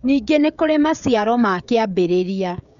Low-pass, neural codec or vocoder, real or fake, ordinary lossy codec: 7.2 kHz; codec, 16 kHz, 16 kbps, FreqCodec, smaller model; fake; none